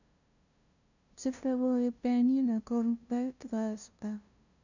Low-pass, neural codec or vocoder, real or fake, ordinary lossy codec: 7.2 kHz; codec, 16 kHz, 0.5 kbps, FunCodec, trained on LibriTTS, 25 frames a second; fake; none